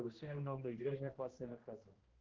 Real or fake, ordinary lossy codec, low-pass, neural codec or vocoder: fake; Opus, 16 kbps; 7.2 kHz; codec, 16 kHz, 1 kbps, X-Codec, HuBERT features, trained on general audio